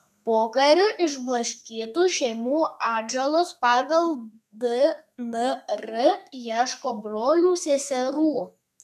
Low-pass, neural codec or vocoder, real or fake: 14.4 kHz; codec, 32 kHz, 1.9 kbps, SNAC; fake